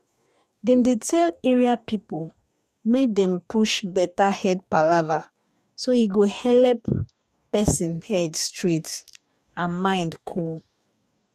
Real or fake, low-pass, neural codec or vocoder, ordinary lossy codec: fake; 14.4 kHz; codec, 44.1 kHz, 2.6 kbps, DAC; none